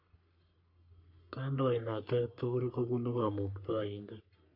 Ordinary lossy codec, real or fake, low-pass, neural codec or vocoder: MP3, 48 kbps; fake; 5.4 kHz; codec, 44.1 kHz, 3.4 kbps, Pupu-Codec